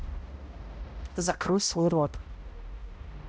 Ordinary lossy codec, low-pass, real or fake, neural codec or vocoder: none; none; fake; codec, 16 kHz, 0.5 kbps, X-Codec, HuBERT features, trained on balanced general audio